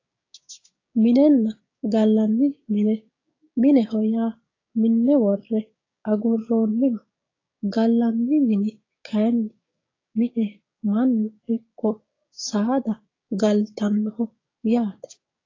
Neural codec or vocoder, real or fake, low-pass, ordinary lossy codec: codec, 16 kHz, 6 kbps, DAC; fake; 7.2 kHz; AAC, 32 kbps